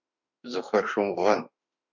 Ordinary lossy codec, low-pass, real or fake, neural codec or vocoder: MP3, 64 kbps; 7.2 kHz; fake; autoencoder, 48 kHz, 32 numbers a frame, DAC-VAE, trained on Japanese speech